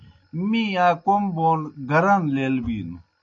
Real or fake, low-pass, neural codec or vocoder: real; 7.2 kHz; none